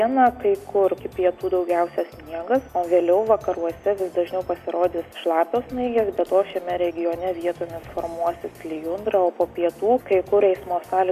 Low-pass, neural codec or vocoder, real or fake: 14.4 kHz; none; real